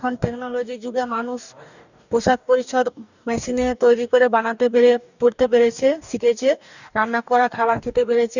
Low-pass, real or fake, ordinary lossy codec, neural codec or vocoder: 7.2 kHz; fake; none; codec, 44.1 kHz, 2.6 kbps, DAC